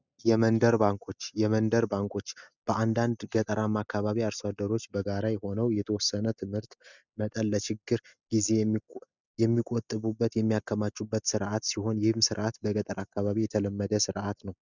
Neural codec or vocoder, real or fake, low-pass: none; real; 7.2 kHz